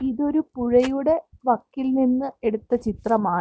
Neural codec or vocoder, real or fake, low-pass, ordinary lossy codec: none; real; none; none